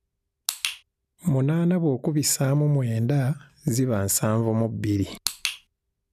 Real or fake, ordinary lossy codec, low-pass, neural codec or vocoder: real; none; 14.4 kHz; none